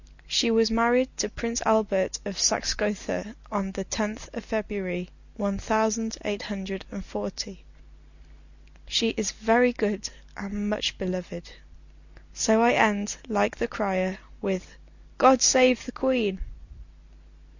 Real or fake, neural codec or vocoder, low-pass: real; none; 7.2 kHz